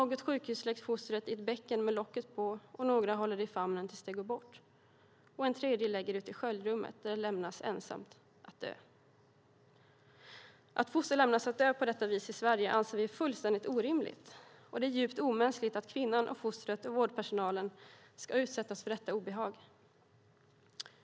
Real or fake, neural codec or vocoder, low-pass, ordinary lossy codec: real; none; none; none